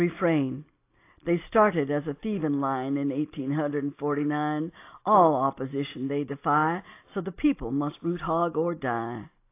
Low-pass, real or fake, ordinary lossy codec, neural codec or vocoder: 3.6 kHz; real; AAC, 24 kbps; none